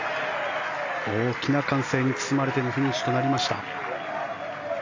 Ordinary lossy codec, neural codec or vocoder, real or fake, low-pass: AAC, 48 kbps; none; real; 7.2 kHz